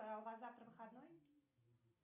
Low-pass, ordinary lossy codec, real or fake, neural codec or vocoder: 3.6 kHz; MP3, 32 kbps; real; none